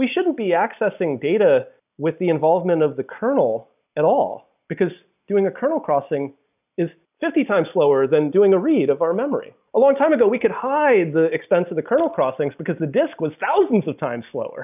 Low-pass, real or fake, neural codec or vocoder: 3.6 kHz; real; none